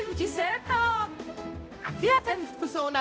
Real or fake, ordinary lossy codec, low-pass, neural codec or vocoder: fake; none; none; codec, 16 kHz, 0.5 kbps, X-Codec, HuBERT features, trained on balanced general audio